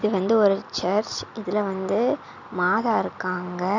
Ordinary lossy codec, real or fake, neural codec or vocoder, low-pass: none; real; none; 7.2 kHz